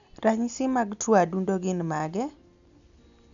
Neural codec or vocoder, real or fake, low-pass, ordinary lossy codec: none; real; 7.2 kHz; none